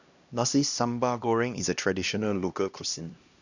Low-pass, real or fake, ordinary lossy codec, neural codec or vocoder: 7.2 kHz; fake; none; codec, 16 kHz, 1 kbps, X-Codec, HuBERT features, trained on LibriSpeech